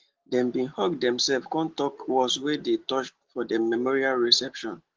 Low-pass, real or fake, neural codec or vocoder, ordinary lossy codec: 7.2 kHz; real; none; Opus, 16 kbps